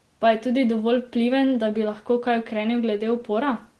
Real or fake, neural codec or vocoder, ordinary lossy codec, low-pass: real; none; Opus, 16 kbps; 10.8 kHz